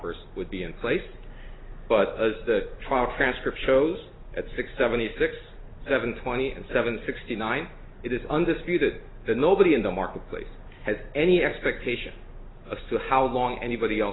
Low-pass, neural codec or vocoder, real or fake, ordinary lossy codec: 7.2 kHz; none; real; AAC, 16 kbps